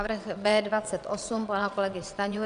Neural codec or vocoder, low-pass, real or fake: vocoder, 22.05 kHz, 80 mel bands, WaveNeXt; 9.9 kHz; fake